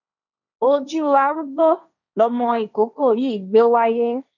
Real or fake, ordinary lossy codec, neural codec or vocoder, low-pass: fake; none; codec, 16 kHz, 1.1 kbps, Voila-Tokenizer; 7.2 kHz